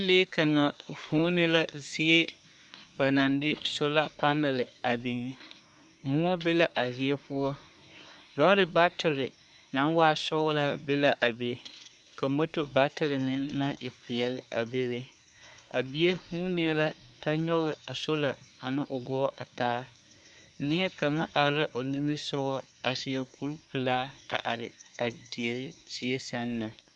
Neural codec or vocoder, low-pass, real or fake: codec, 24 kHz, 1 kbps, SNAC; 10.8 kHz; fake